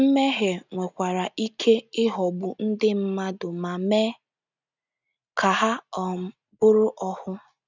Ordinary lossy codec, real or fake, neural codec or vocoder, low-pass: none; real; none; 7.2 kHz